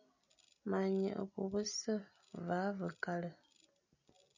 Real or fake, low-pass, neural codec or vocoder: real; 7.2 kHz; none